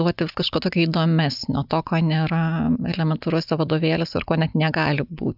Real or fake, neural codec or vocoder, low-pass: real; none; 5.4 kHz